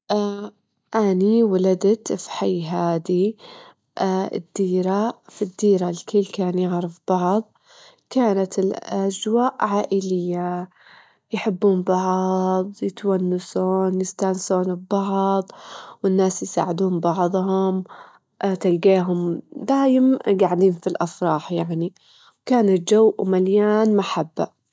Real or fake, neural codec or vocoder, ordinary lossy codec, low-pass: real; none; none; none